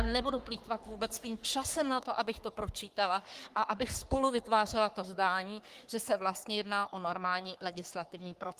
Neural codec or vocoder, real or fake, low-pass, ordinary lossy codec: codec, 44.1 kHz, 3.4 kbps, Pupu-Codec; fake; 14.4 kHz; Opus, 24 kbps